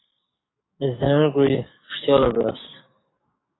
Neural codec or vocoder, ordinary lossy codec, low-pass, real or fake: codec, 44.1 kHz, 7.8 kbps, DAC; AAC, 16 kbps; 7.2 kHz; fake